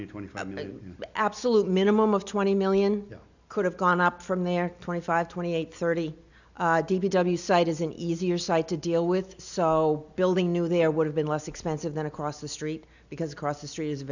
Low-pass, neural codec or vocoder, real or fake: 7.2 kHz; none; real